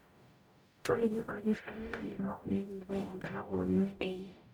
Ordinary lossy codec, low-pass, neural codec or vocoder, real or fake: none; none; codec, 44.1 kHz, 0.9 kbps, DAC; fake